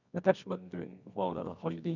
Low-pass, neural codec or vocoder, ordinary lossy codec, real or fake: 7.2 kHz; codec, 24 kHz, 0.9 kbps, WavTokenizer, medium music audio release; none; fake